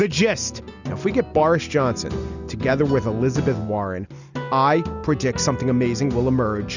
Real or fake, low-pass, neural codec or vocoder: real; 7.2 kHz; none